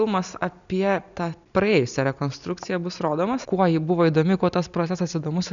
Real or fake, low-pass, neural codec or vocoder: real; 7.2 kHz; none